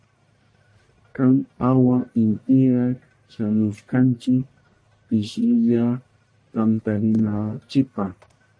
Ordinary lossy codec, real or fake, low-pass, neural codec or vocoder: MP3, 48 kbps; fake; 9.9 kHz; codec, 44.1 kHz, 1.7 kbps, Pupu-Codec